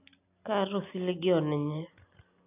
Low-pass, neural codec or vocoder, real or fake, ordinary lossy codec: 3.6 kHz; none; real; AAC, 32 kbps